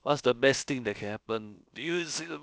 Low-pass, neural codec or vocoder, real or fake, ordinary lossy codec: none; codec, 16 kHz, about 1 kbps, DyCAST, with the encoder's durations; fake; none